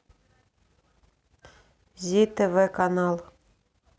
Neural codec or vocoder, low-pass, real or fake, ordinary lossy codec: none; none; real; none